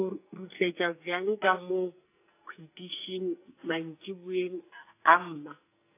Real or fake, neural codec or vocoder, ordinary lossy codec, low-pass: fake; codec, 44.1 kHz, 2.6 kbps, SNAC; AAC, 24 kbps; 3.6 kHz